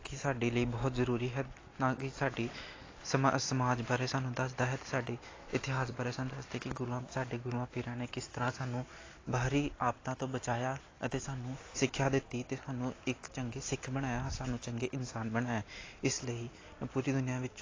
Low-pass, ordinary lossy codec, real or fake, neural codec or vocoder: 7.2 kHz; AAC, 32 kbps; real; none